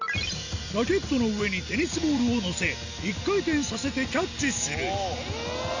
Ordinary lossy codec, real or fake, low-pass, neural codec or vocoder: none; real; 7.2 kHz; none